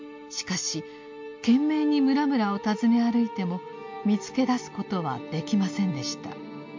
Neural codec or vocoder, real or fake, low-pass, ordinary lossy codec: none; real; 7.2 kHz; MP3, 48 kbps